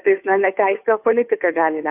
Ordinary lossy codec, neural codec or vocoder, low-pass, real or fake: AAC, 24 kbps; codec, 16 kHz, 2 kbps, FunCodec, trained on Chinese and English, 25 frames a second; 3.6 kHz; fake